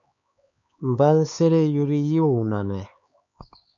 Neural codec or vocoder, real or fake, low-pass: codec, 16 kHz, 4 kbps, X-Codec, HuBERT features, trained on LibriSpeech; fake; 7.2 kHz